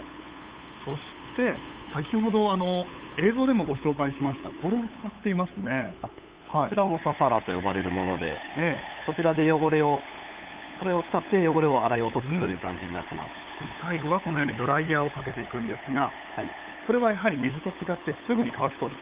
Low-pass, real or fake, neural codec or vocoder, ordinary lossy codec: 3.6 kHz; fake; codec, 16 kHz, 8 kbps, FunCodec, trained on LibriTTS, 25 frames a second; Opus, 32 kbps